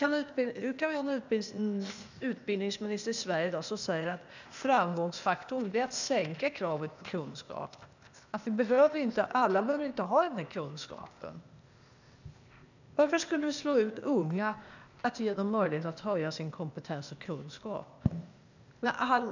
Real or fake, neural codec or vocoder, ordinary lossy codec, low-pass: fake; codec, 16 kHz, 0.8 kbps, ZipCodec; none; 7.2 kHz